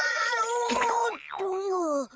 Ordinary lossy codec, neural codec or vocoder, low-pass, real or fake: none; codec, 16 kHz, 8 kbps, FreqCodec, larger model; none; fake